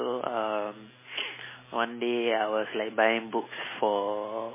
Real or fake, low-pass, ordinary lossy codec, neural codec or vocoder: real; 3.6 kHz; MP3, 16 kbps; none